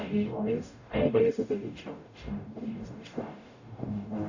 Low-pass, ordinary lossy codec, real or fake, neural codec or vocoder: 7.2 kHz; none; fake; codec, 44.1 kHz, 0.9 kbps, DAC